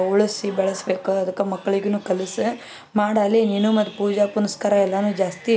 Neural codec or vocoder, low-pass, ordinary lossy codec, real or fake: none; none; none; real